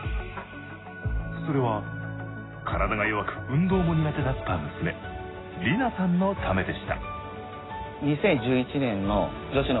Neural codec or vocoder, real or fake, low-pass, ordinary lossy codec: none; real; 7.2 kHz; AAC, 16 kbps